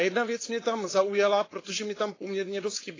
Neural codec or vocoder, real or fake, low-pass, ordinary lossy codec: codec, 16 kHz, 4.8 kbps, FACodec; fake; 7.2 kHz; AAC, 32 kbps